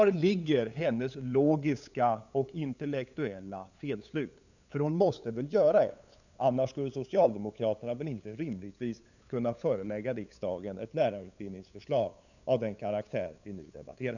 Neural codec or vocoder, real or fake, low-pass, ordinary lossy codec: codec, 16 kHz, 8 kbps, FunCodec, trained on LibriTTS, 25 frames a second; fake; 7.2 kHz; none